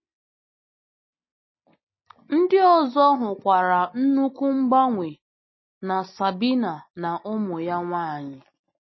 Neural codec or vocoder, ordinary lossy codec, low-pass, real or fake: none; MP3, 24 kbps; 7.2 kHz; real